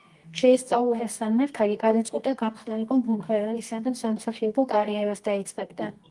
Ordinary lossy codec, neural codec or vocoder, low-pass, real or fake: Opus, 24 kbps; codec, 24 kHz, 0.9 kbps, WavTokenizer, medium music audio release; 10.8 kHz; fake